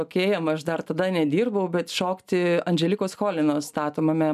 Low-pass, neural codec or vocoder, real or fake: 14.4 kHz; none; real